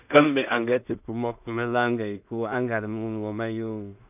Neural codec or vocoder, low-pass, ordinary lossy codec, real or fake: codec, 16 kHz in and 24 kHz out, 0.4 kbps, LongCat-Audio-Codec, two codebook decoder; 3.6 kHz; none; fake